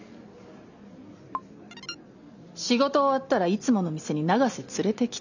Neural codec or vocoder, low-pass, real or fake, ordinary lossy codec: none; 7.2 kHz; real; none